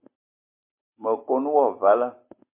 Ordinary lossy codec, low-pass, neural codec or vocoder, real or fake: MP3, 24 kbps; 3.6 kHz; none; real